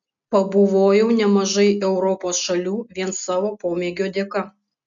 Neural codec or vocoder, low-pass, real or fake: none; 7.2 kHz; real